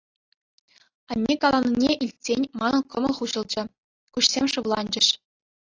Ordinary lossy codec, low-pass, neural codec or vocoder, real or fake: AAC, 32 kbps; 7.2 kHz; none; real